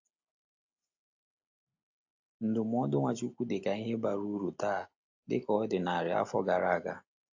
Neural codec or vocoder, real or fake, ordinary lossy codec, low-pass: none; real; AAC, 48 kbps; 7.2 kHz